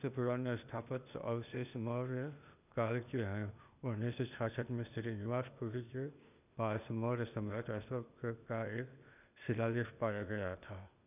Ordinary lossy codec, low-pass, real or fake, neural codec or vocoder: none; 3.6 kHz; fake; codec, 16 kHz, 0.8 kbps, ZipCodec